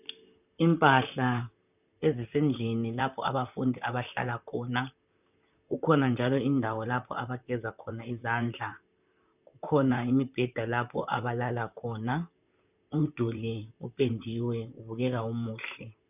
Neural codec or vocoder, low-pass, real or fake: vocoder, 44.1 kHz, 128 mel bands, Pupu-Vocoder; 3.6 kHz; fake